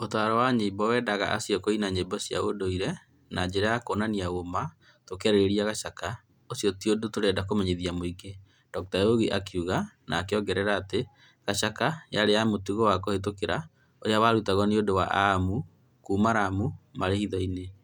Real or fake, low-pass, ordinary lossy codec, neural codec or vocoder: real; 19.8 kHz; none; none